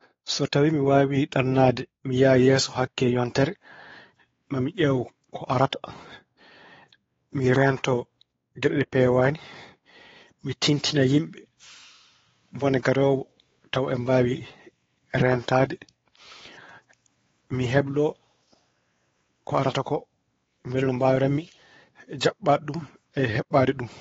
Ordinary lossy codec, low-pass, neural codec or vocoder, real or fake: AAC, 24 kbps; 7.2 kHz; codec, 16 kHz, 4 kbps, X-Codec, WavLM features, trained on Multilingual LibriSpeech; fake